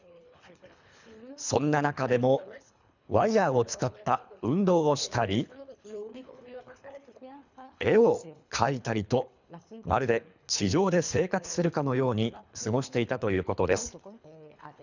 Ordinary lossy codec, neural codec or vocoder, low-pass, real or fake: none; codec, 24 kHz, 3 kbps, HILCodec; 7.2 kHz; fake